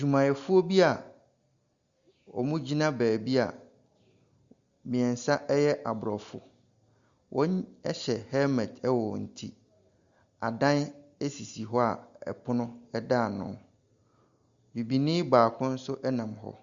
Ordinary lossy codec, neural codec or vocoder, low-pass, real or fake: Opus, 64 kbps; none; 7.2 kHz; real